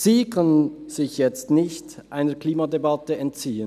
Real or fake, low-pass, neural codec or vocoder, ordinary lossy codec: real; 14.4 kHz; none; none